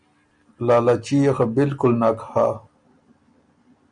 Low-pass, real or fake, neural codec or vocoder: 9.9 kHz; real; none